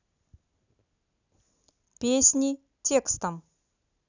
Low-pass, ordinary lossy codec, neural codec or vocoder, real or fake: 7.2 kHz; none; none; real